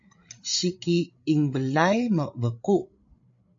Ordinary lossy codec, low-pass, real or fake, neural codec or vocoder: MP3, 48 kbps; 7.2 kHz; fake; codec, 16 kHz, 8 kbps, FreqCodec, larger model